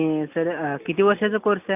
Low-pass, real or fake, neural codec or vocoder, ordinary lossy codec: 3.6 kHz; real; none; none